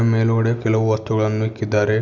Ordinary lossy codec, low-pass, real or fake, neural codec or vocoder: none; 7.2 kHz; real; none